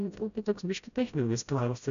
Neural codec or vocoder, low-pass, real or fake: codec, 16 kHz, 0.5 kbps, FreqCodec, smaller model; 7.2 kHz; fake